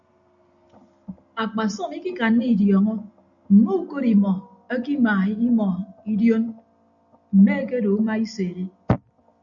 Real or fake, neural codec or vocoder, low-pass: real; none; 7.2 kHz